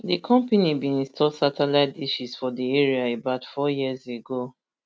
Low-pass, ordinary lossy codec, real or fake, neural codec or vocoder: none; none; real; none